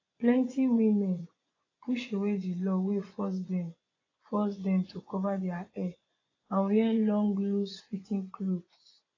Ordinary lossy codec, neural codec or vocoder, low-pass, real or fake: AAC, 32 kbps; vocoder, 24 kHz, 100 mel bands, Vocos; 7.2 kHz; fake